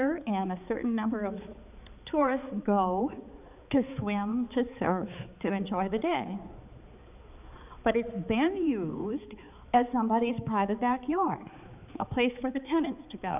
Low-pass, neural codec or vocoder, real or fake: 3.6 kHz; codec, 16 kHz, 4 kbps, X-Codec, HuBERT features, trained on balanced general audio; fake